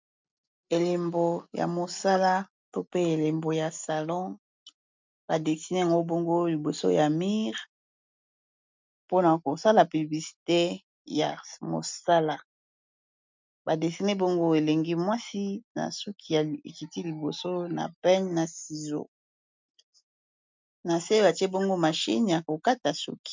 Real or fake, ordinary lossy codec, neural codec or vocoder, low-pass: real; MP3, 64 kbps; none; 7.2 kHz